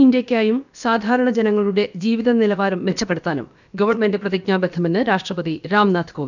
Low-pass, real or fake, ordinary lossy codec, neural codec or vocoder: 7.2 kHz; fake; none; codec, 16 kHz, about 1 kbps, DyCAST, with the encoder's durations